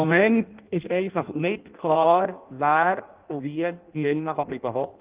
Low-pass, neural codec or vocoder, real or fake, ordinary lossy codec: 3.6 kHz; codec, 16 kHz in and 24 kHz out, 0.6 kbps, FireRedTTS-2 codec; fake; Opus, 24 kbps